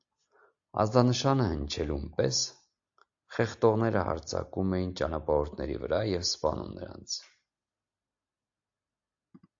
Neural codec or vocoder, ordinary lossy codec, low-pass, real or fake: none; AAC, 64 kbps; 7.2 kHz; real